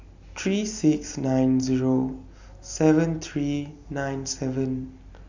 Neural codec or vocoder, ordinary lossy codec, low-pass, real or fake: none; Opus, 64 kbps; 7.2 kHz; real